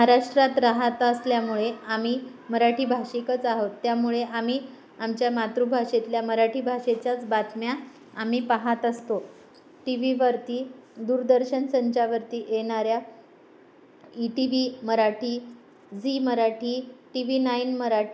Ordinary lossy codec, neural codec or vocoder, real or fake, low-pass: none; none; real; none